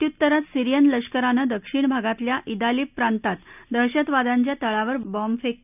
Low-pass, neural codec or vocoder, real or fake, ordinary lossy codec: 3.6 kHz; none; real; none